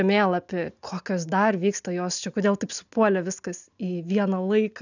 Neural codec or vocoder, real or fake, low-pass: none; real; 7.2 kHz